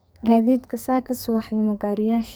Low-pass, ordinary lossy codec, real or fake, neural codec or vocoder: none; none; fake; codec, 44.1 kHz, 2.6 kbps, SNAC